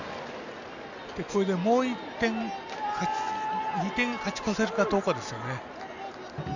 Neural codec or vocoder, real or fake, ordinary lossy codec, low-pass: none; real; none; 7.2 kHz